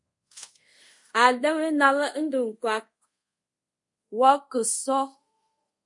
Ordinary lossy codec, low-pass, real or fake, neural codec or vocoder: MP3, 48 kbps; 10.8 kHz; fake; codec, 24 kHz, 0.5 kbps, DualCodec